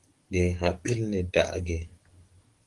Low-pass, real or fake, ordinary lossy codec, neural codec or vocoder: 10.8 kHz; fake; Opus, 24 kbps; codec, 44.1 kHz, 7.8 kbps, DAC